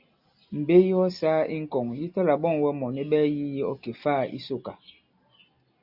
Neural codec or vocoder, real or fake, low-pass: none; real; 5.4 kHz